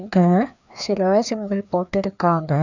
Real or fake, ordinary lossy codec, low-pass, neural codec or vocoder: fake; none; 7.2 kHz; codec, 16 kHz, 2 kbps, FreqCodec, larger model